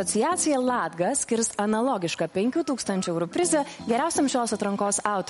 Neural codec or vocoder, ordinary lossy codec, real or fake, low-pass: none; MP3, 48 kbps; real; 19.8 kHz